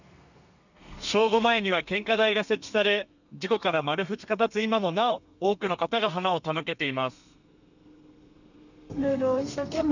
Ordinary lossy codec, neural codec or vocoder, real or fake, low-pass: none; codec, 32 kHz, 1.9 kbps, SNAC; fake; 7.2 kHz